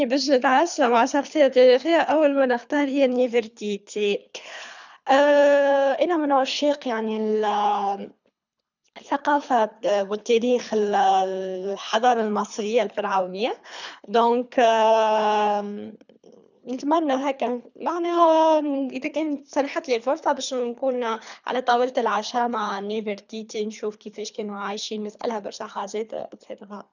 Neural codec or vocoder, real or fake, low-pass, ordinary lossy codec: codec, 24 kHz, 3 kbps, HILCodec; fake; 7.2 kHz; none